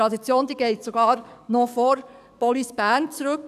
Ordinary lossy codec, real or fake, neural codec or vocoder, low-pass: none; fake; codec, 44.1 kHz, 7.8 kbps, DAC; 14.4 kHz